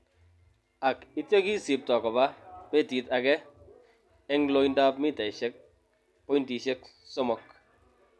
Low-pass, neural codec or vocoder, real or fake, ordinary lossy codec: none; none; real; none